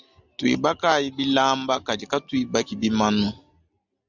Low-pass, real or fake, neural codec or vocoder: 7.2 kHz; real; none